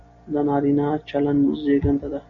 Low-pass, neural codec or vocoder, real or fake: 7.2 kHz; none; real